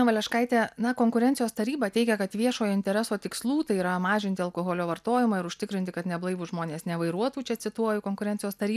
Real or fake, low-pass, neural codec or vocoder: real; 14.4 kHz; none